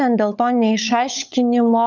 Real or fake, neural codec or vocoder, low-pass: fake; codec, 16 kHz, 16 kbps, FunCodec, trained on LibriTTS, 50 frames a second; 7.2 kHz